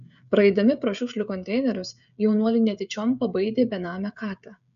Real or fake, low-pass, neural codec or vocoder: fake; 7.2 kHz; codec, 16 kHz, 16 kbps, FreqCodec, smaller model